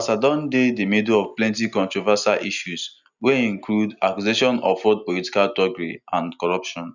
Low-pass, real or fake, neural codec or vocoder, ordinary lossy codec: 7.2 kHz; real; none; none